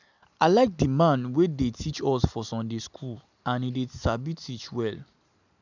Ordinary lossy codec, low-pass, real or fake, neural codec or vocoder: none; 7.2 kHz; real; none